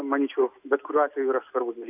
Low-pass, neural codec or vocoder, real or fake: 3.6 kHz; none; real